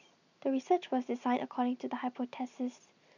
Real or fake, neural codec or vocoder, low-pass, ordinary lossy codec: real; none; 7.2 kHz; none